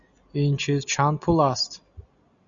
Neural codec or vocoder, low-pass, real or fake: none; 7.2 kHz; real